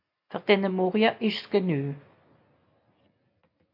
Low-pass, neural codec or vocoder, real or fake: 5.4 kHz; none; real